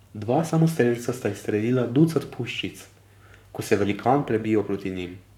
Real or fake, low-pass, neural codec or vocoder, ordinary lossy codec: fake; 19.8 kHz; codec, 44.1 kHz, 7.8 kbps, Pupu-Codec; none